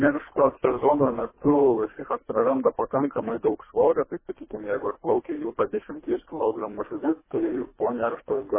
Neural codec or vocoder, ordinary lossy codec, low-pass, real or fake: codec, 24 kHz, 1.5 kbps, HILCodec; MP3, 16 kbps; 3.6 kHz; fake